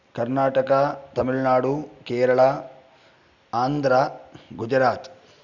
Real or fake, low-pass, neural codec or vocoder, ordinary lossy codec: real; 7.2 kHz; none; none